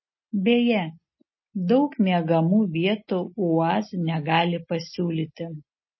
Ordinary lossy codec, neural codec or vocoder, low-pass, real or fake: MP3, 24 kbps; none; 7.2 kHz; real